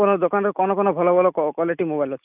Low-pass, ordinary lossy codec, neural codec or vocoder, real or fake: 3.6 kHz; none; none; real